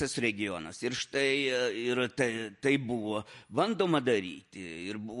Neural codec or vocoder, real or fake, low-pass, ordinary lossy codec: none; real; 14.4 kHz; MP3, 48 kbps